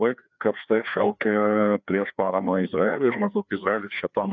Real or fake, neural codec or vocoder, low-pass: fake; codec, 16 kHz, 2 kbps, FreqCodec, larger model; 7.2 kHz